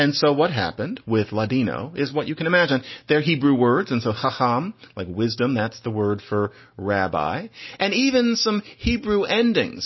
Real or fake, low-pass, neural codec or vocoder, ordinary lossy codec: real; 7.2 kHz; none; MP3, 24 kbps